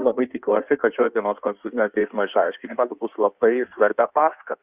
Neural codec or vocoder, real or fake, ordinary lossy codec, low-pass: codec, 16 kHz in and 24 kHz out, 1.1 kbps, FireRedTTS-2 codec; fake; Opus, 64 kbps; 3.6 kHz